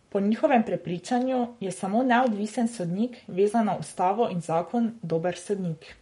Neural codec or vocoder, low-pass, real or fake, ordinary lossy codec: codec, 44.1 kHz, 7.8 kbps, Pupu-Codec; 19.8 kHz; fake; MP3, 48 kbps